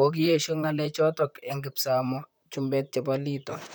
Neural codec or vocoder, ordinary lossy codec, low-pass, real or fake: vocoder, 44.1 kHz, 128 mel bands, Pupu-Vocoder; none; none; fake